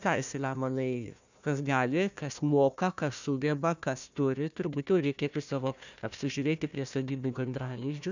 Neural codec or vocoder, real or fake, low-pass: codec, 16 kHz, 1 kbps, FunCodec, trained on Chinese and English, 50 frames a second; fake; 7.2 kHz